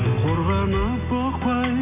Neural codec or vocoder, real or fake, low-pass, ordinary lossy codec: none; real; 3.6 kHz; MP3, 24 kbps